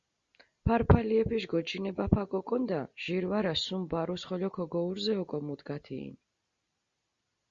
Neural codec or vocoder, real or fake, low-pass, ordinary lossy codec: none; real; 7.2 kHz; Opus, 64 kbps